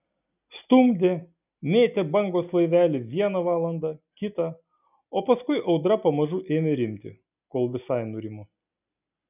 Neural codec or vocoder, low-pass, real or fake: none; 3.6 kHz; real